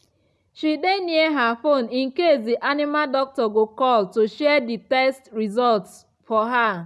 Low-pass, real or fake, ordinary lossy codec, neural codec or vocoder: none; real; none; none